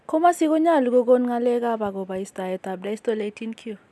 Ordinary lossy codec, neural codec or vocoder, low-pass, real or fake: none; none; none; real